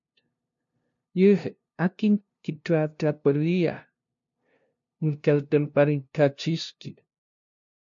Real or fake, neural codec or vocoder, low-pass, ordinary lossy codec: fake; codec, 16 kHz, 0.5 kbps, FunCodec, trained on LibriTTS, 25 frames a second; 7.2 kHz; MP3, 48 kbps